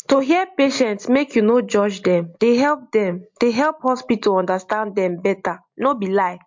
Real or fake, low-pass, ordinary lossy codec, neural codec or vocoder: real; 7.2 kHz; MP3, 48 kbps; none